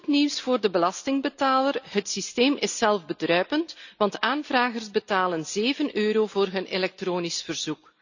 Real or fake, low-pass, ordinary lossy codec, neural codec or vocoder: real; 7.2 kHz; none; none